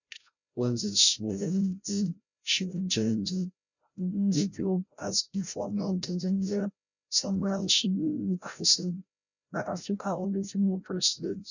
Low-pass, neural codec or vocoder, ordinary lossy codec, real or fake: 7.2 kHz; codec, 16 kHz, 0.5 kbps, FreqCodec, larger model; none; fake